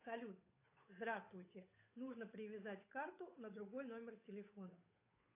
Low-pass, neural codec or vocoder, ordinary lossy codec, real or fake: 3.6 kHz; codec, 16 kHz, 8 kbps, FunCodec, trained on Chinese and English, 25 frames a second; AAC, 24 kbps; fake